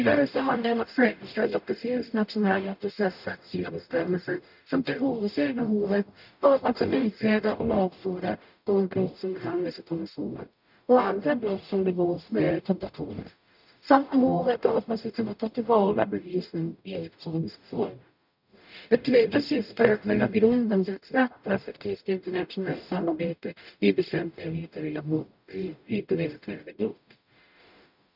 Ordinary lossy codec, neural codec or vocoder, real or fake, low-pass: none; codec, 44.1 kHz, 0.9 kbps, DAC; fake; 5.4 kHz